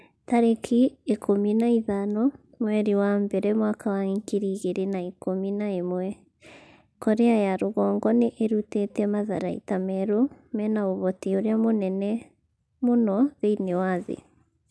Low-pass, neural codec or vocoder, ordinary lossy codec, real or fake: none; none; none; real